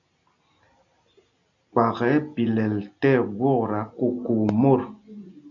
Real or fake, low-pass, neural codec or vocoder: real; 7.2 kHz; none